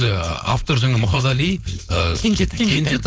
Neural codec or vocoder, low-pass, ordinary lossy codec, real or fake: codec, 16 kHz, 4.8 kbps, FACodec; none; none; fake